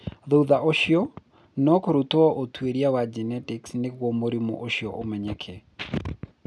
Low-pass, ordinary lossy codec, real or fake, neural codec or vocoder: none; none; real; none